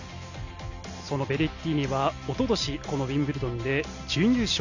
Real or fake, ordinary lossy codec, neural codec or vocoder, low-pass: real; none; none; 7.2 kHz